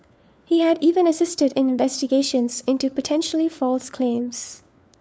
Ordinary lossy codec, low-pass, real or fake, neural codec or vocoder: none; none; fake; codec, 16 kHz, 4 kbps, FunCodec, trained on LibriTTS, 50 frames a second